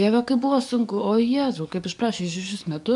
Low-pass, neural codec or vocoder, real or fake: 10.8 kHz; codec, 44.1 kHz, 7.8 kbps, DAC; fake